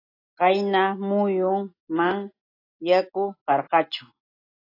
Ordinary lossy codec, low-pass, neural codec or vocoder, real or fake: AAC, 24 kbps; 5.4 kHz; none; real